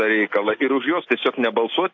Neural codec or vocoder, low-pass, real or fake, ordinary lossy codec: none; 7.2 kHz; real; AAC, 48 kbps